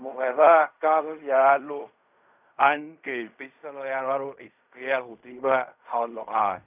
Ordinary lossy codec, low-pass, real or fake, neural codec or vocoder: none; 3.6 kHz; fake; codec, 16 kHz in and 24 kHz out, 0.4 kbps, LongCat-Audio-Codec, fine tuned four codebook decoder